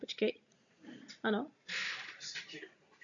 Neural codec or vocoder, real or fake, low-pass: none; real; 7.2 kHz